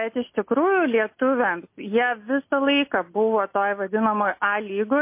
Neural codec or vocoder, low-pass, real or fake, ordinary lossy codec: none; 3.6 kHz; real; MP3, 24 kbps